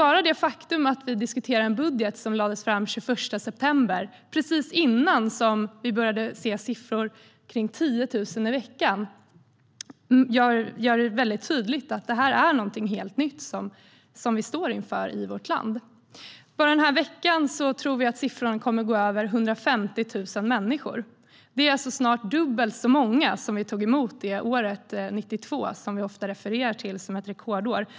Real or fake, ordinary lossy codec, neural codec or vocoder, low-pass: real; none; none; none